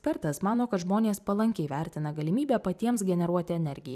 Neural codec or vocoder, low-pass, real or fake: vocoder, 44.1 kHz, 128 mel bands every 256 samples, BigVGAN v2; 14.4 kHz; fake